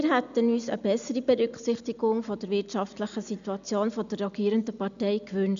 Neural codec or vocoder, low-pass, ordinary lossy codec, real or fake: none; 7.2 kHz; none; real